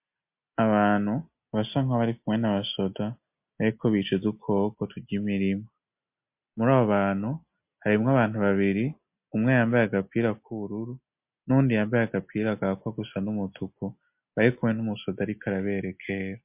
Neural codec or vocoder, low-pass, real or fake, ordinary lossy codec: none; 3.6 kHz; real; MP3, 32 kbps